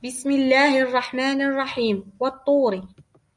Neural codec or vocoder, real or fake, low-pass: none; real; 10.8 kHz